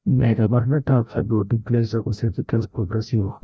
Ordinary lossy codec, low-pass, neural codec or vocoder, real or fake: none; none; codec, 16 kHz, 0.5 kbps, FreqCodec, larger model; fake